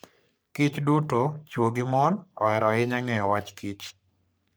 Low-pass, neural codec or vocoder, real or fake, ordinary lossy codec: none; codec, 44.1 kHz, 3.4 kbps, Pupu-Codec; fake; none